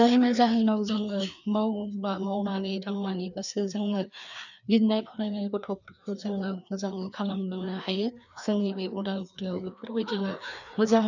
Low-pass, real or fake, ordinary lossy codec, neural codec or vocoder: 7.2 kHz; fake; none; codec, 16 kHz, 2 kbps, FreqCodec, larger model